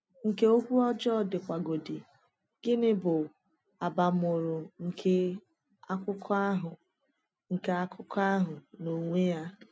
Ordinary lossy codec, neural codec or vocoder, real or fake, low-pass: none; none; real; none